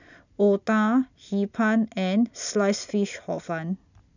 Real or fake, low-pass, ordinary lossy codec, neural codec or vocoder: real; 7.2 kHz; none; none